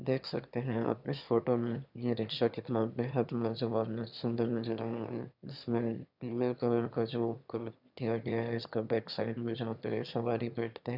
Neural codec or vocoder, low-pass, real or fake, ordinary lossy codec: autoencoder, 22.05 kHz, a latent of 192 numbers a frame, VITS, trained on one speaker; 5.4 kHz; fake; none